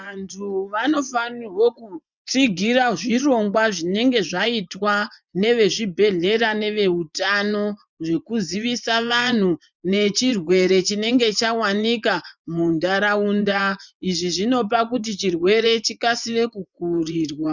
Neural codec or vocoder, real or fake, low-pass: vocoder, 24 kHz, 100 mel bands, Vocos; fake; 7.2 kHz